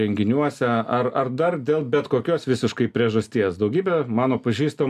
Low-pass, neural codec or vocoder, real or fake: 14.4 kHz; none; real